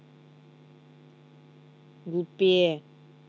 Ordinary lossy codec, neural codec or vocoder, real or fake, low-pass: none; none; real; none